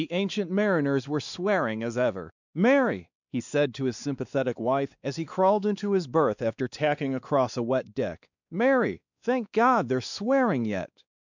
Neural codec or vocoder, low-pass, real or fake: codec, 16 kHz, 2 kbps, X-Codec, WavLM features, trained on Multilingual LibriSpeech; 7.2 kHz; fake